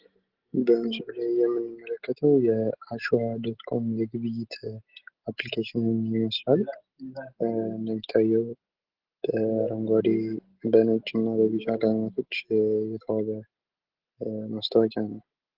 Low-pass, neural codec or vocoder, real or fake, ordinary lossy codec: 5.4 kHz; none; real; Opus, 16 kbps